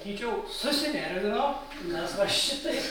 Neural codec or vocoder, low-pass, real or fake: none; 19.8 kHz; real